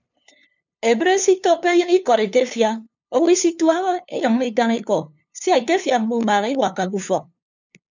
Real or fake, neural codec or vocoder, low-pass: fake; codec, 16 kHz, 2 kbps, FunCodec, trained on LibriTTS, 25 frames a second; 7.2 kHz